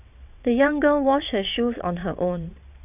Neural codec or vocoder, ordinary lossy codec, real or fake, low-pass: vocoder, 22.05 kHz, 80 mel bands, WaveNeXt; none; fake; 3.6 kHz